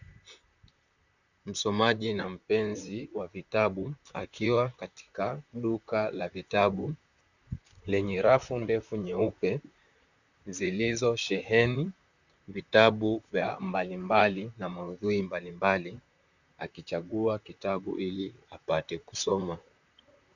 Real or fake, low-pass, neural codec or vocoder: fake; 7.2 kHz; vocoder, 44.1 kHz, 128 mel bands, Pupu-Vocoder